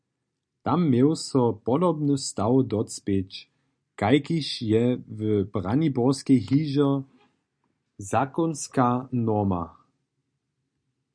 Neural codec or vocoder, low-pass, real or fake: none; 9.9 kHz; real